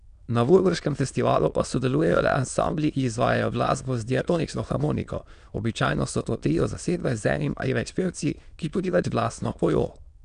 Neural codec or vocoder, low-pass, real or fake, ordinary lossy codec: autoencoder, 22.05 kHz, a latent of 192 numbers a frame, VITS, trained on many speakers; 9.9 kHz; fake; none